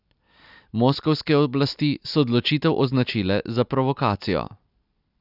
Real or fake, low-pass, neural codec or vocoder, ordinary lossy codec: real; 5.4 kHz; none; none